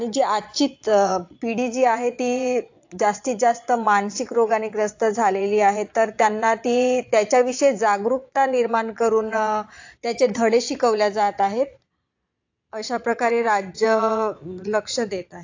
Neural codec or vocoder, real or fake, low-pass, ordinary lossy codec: vocoder, 22.05 kHz, 80 mel bands, Vocos; fake; 7.2 kHz; AAC, 48 kbps